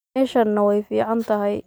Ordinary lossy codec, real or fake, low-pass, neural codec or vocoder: none; real; none; none